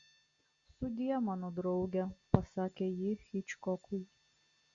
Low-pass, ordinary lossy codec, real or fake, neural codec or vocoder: 7.2 kHz; Opus, 64 kbps; real; none